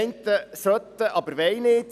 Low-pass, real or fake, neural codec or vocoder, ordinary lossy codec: 14.4 kHz; real; none; none